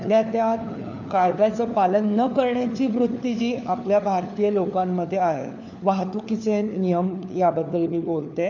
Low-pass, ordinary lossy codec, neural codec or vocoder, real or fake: 7.2 kHz; none; codec, 16 kHz, 4 kbps, FunCodec, trained on LibriTTS, 50 frames a second; fake